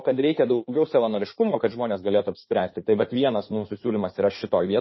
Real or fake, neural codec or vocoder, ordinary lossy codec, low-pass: fake; codec, 16 kHz in and 24 kHz out, 2.2 kbps, FireRedTTS-2 codec; MP3, 24 kbps; 7.2 kHz